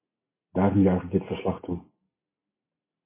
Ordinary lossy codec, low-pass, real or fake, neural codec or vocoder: MP3, 16 kbps; 3.6 kHz; real; none